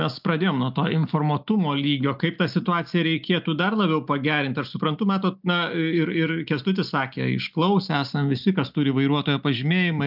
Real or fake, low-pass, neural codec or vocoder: real; 5.4 kHz; none